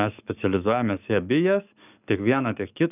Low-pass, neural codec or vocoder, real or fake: 3.6 kHz; codec, 44.1 kHz, 7.8 kbps, DAC; fake